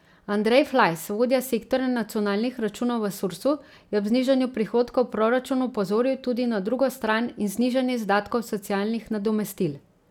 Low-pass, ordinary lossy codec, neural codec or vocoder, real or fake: 19.8 kHz; none; none; real